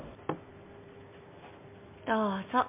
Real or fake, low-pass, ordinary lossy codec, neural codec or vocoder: real; 3.6 kHz; MP3, 32 kbps; none